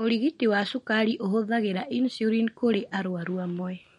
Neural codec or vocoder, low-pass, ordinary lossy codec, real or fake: none; 19.8 kHz; MP3, 48 kbps; real